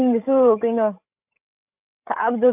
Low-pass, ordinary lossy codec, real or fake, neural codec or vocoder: 3.6 kHz; none; fake; codec, 16 kHz, 16 kbps, FreqCodec, larger model